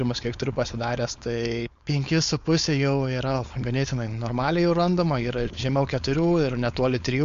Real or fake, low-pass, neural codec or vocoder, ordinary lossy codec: fake; 7.2 kHz; codec, 16 kHz, 4.8 kbps, FACodec; MP3, 64 kbps